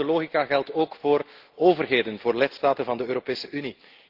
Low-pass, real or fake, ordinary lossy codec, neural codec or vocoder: 5.4 kHz; real; Opus, 16 kbps; none